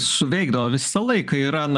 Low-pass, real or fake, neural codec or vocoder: 10.8 kHz; fake; vocoder, 44.1 kHz, 128 mel bands every 512 samples, BigVGAN v2